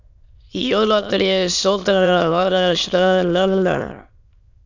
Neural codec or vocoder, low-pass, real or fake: autoencoder, 22.05 kHz, a latent of 192 numbers a frame, VITS, trained on many speakers; 7.2 kHz; fake